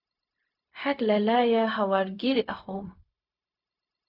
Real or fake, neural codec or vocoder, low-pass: fake; codec, 16 kHz, 0.4 kbps, LongCat-Audio-Codec; 5.4 kHz